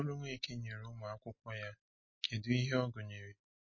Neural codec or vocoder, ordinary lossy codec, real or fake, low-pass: none; MP3, 32 kbps; real; 7.2 kHz